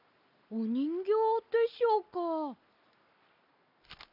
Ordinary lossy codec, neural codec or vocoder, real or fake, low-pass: none; none; real; 5.4 kHz